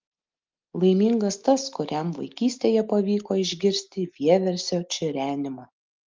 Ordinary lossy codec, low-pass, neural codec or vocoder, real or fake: Opus, 32 kbps; 7.2 kHz; none; real